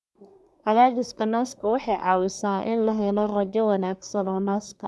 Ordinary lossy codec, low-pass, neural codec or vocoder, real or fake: none; none; codec, 24 kHz, 1 kbps, SNAC; fake